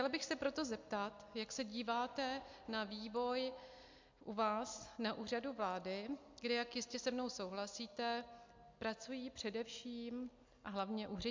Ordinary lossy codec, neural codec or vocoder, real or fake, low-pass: MP3, 64 kbps; none; real; 7.2 kHz